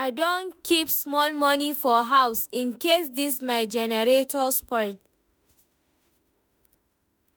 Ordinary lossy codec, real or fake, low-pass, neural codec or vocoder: none; fake; none; autoencoder, 48 kHz, 32 numbers a frame, DAC-VAE, trained on Japanese speech